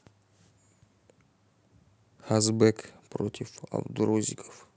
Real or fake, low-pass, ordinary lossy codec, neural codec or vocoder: real; none; none; none